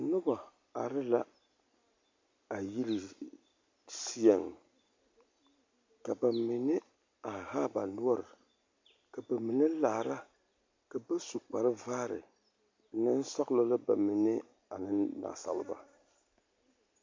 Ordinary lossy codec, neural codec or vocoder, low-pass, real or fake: MP3, 48 kbps; none; 7.2 kHz; real